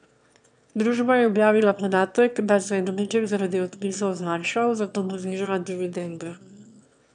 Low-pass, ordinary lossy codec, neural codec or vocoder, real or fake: 9.9 kHz; none; autoencoder, 22.05 kHz, a latent of 192 numbers a frame, VITS, trained on one speaker; fake